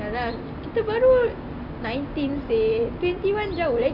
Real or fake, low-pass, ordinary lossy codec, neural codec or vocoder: real; 5.4 kHz; none; none